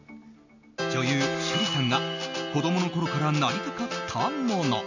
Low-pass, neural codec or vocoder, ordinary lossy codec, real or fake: 7.2 kHz; none; none; real